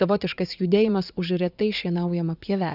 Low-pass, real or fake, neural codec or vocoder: 5.4 kHz; fake; vocoder, 44.1 kHz, 80 mel bands, Vocos